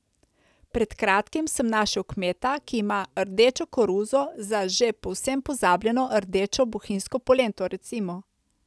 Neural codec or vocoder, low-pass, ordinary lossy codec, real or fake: none; none; none; real